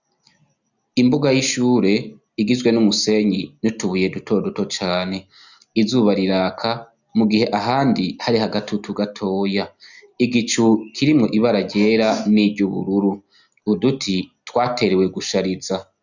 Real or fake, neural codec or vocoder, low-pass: real; none; 7.2 kHz